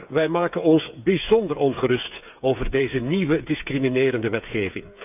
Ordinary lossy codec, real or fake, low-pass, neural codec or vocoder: none; fake; 3.6 kHz; codec, 16 kHz, 8 kbps, FreqCodec, smaller model